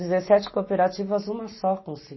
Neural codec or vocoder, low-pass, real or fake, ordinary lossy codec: none; 7.2 kHz; real; MP3, 24 kbps